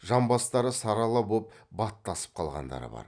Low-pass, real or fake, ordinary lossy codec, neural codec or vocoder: 9.9 kHz; real; none; none